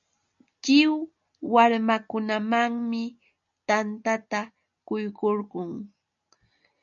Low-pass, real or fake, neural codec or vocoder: 7.2 kHz; real; none